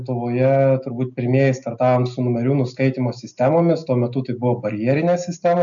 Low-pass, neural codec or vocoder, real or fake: 7.2 kHz; none; real